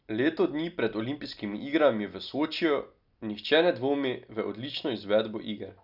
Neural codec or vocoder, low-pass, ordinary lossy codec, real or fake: none; 5.4 kHz; none; real